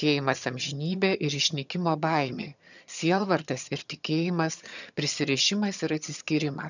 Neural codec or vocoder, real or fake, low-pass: vocoder, 22.05 kHz, 80 mel bands, HiFi-GAN; fake; 7.2 kHz